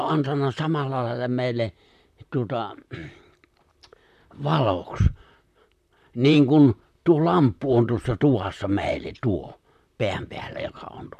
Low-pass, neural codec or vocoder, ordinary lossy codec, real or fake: 14.4 kHz; vocoder, 44.1 kHz, 128 mel bands, Pupu-Vocoder; none; fake